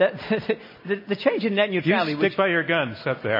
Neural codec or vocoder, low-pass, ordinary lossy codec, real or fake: none; 5.4 kHz; MP3, 24 kbps; real